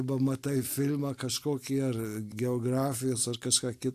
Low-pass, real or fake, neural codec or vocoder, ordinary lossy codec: 14.4 kHz; real; none; AAC, 64 kbps